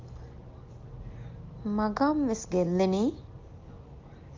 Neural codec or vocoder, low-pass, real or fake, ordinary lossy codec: none; 7.2 kHz; real; Opus, 32 kbps